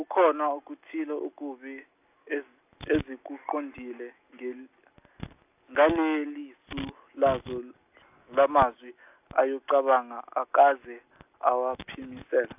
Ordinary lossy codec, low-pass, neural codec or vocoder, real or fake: AAC, 32 kbps; 3.6 kHz; none; real